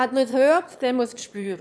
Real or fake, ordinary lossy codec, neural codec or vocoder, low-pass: fake; none; autoencoder, 22.05 kHz, a latent of 192 numbers a frame, VITS, trained on one speaker; none